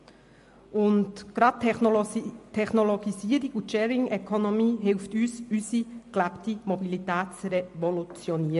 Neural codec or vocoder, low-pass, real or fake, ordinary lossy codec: none; 14.4 kHz; real; MP3, 48 kbps